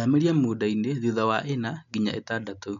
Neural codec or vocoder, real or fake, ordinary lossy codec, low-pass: none; real; none; 7.2 kHz